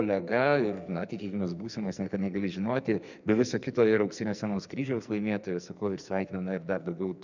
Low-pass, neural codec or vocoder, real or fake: 7.2 kHz; codec, 44.1 kHz, 2.6 kbps, SNAC; fake